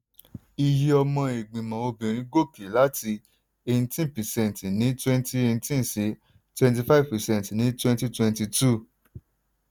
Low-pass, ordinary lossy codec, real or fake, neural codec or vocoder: none; none; real; none